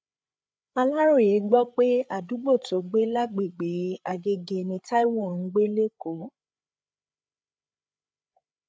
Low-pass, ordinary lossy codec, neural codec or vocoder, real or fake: none; none; codec, 16 kHz, 8 kbps, FreqCodec, larger model; fake